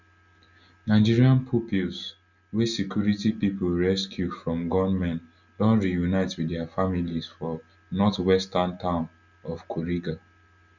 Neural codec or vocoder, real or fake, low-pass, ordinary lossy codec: none; real; 7.2 kHz; none